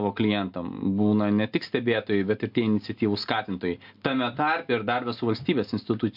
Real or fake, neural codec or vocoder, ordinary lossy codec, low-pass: real; none; MP3, 48 kbps; 5.4 kHz